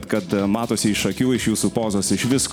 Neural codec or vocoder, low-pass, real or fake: none; 19.8 kHz; real